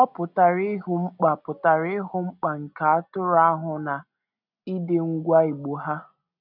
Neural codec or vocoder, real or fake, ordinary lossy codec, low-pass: none; real; none; 5.4 kHz